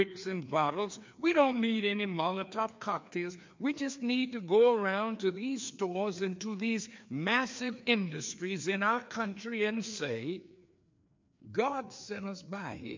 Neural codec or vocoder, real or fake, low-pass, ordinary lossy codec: codec, 16 kHz, 2 kbps, FreqCodec, larger model; fake; 7.2 kHz; MP3, 48 kbps